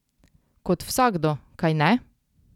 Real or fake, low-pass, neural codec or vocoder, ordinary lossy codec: real; 19.8 kHz; none; none